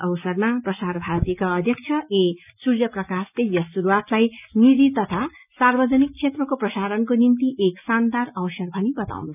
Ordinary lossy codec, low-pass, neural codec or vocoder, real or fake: none; 3.6 kHz; none; real